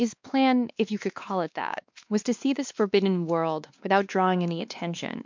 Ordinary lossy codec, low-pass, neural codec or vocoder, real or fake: MP3, 64 kbps; 7.2 kHz; codec, 16 kHz, 2 kbps, X-Codec, WavLM features, trained on Multilingual LibriSpeech; fake